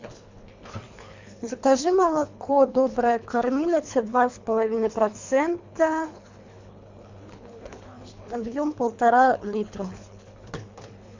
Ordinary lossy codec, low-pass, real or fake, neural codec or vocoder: MP3, 64 kbps; 7.2 kHz; fake; codec, 24 kHz, 3 kbps, HILCodec